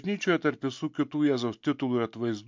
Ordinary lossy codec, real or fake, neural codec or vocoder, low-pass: MP3, 64 kbps; real; none; 7.2 kHz